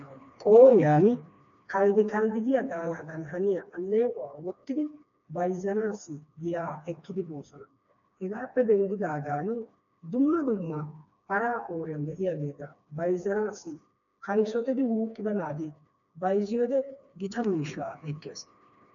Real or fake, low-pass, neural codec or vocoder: fake; 7.2 kHz; codec, 16 kHz, 2 kbps, FreqCodec, smaller model